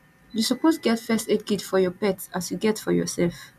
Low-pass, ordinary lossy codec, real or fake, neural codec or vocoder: 14.4 kHz; MP3, 96 kbps; real; none